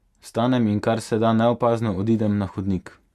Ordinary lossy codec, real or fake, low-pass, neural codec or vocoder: Opus, 64 kbps; real; 14.4 kHz; none